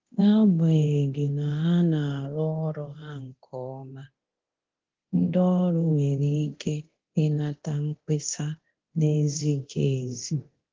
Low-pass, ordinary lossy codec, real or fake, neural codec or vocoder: 7.2 kHz; Opus, 16 kbps; fake; codec, 24 kHz, 0.9 kbps, DualCodec